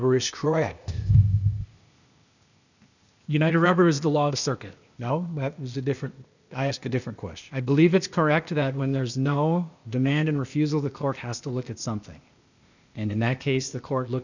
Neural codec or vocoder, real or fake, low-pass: codec, 16 kHz, 0.8 kbps, ZipCodec; fake; 7.2 kHz